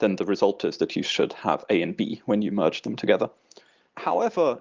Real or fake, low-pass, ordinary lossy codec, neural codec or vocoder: real; 7.2 kHz; Opus, 32 kbps; none